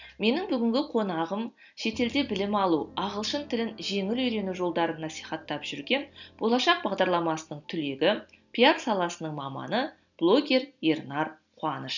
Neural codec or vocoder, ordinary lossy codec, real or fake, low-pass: none; none; real; 7.2 kHz